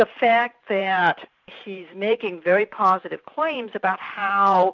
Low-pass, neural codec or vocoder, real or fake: 7.2 kHz; vocoder, 44.1 kHz, 128 mel bands every 512 samples, BigVGAN v2; fake